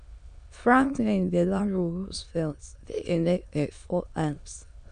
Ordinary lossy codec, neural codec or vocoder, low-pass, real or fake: AAC, 96 kbps; autoencoder, 22.05 kHz, a latent of 192 numbers a frame, VITS, trained on many speakers; 9.9 kHz; fake